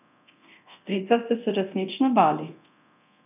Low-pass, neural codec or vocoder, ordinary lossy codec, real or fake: 3.6 kHz; codec, 24 kHz, 0.9 kbps, DualCodec; none; fake